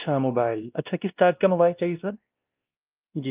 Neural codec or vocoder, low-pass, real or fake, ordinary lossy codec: codec, 16 kHz, 1 kbps, X-Codec, WavLM features, trained on Multilingual LibriSpeech; 3.6 kHz; fake; Opus, 32 kbps